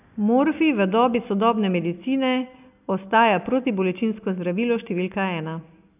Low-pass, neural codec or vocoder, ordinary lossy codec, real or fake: 3.6 kHz; none; none; real